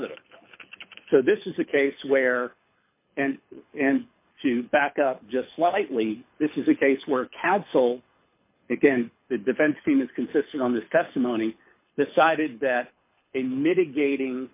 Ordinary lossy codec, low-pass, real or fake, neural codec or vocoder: MP3, 32 kbps; 3.6 kHz; fake; codec, 24 kHz, 6 kbps, HILCodec